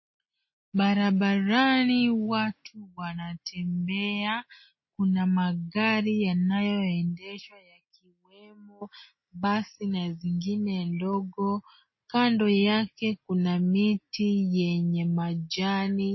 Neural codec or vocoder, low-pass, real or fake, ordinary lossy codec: none; 7.2 kHz; real; MP3, 24 kbps